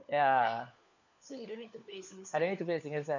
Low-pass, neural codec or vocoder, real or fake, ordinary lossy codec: 7.2 kHz; codec, 16 kHz, 4 kbps, FunCodec, trained on LibriTTS, 50 frames a second; fake; none